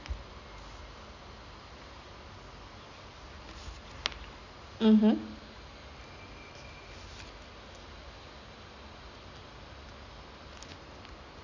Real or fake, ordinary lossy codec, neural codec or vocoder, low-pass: real; none; none; 7.2 kHz